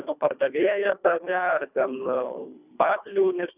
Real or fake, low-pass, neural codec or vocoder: fake; 3.6 kHz; codec, 24 kHz, 1.5 kbps, HILCodec